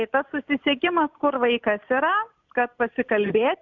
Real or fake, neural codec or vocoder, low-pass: real; none; 7.2 kHz